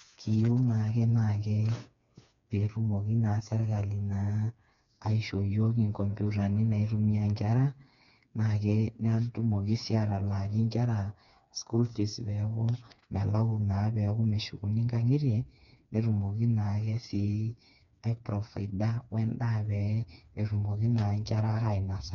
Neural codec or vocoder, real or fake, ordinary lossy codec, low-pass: codec, 16 kHz, 4 kbps, FreqCodec, smaller model; fake; none; 7.2 kHz